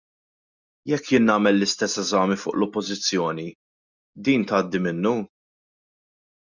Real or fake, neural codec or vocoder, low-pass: real; none; 7.2 kHz